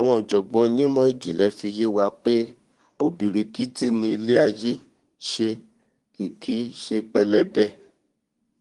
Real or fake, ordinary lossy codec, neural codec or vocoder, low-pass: fake; Opus, 24 kbps; codec, 24 kHz, 1 kbps, SNAC; 10.8 kHz